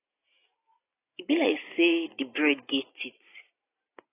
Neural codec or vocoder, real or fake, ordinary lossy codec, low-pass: none; real; AAC, 16 kbps; 3.6 kHz